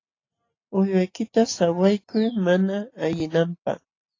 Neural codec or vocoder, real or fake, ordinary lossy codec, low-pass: none; real; AAC, 32 kbps; 7.2 kHz